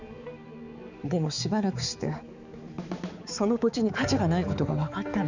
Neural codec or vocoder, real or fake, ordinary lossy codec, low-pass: codec, 16 kHz, 4 kbps, X-Codec, HuBERT features, trained on balanced general audio; fake; none; 7.2 kHz